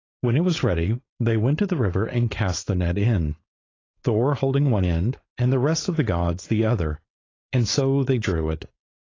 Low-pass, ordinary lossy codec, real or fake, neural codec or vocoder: 7.2 kHz; AAC, 32 kbps; fake; codec, 16 kHz, 4.8 kbps, FACodec